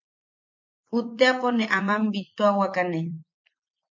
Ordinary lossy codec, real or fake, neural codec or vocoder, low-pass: MP3, 48 kbps; fake; vocoder, 44.1 kHz, 80 mel bands, Vocos; 7.2 kHz